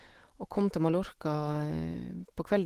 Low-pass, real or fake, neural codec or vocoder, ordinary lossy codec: 14.4 kHz; fake; autoencoder, 48 kHz, 128 numbers a frame, DAC-VAE, trained on Japanese speech; Opus, 16 kbps